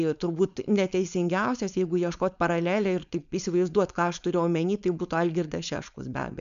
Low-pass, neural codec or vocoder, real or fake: 7.2 kHz; codec, 16 kHz, 4.8 kbps, FACodec; fake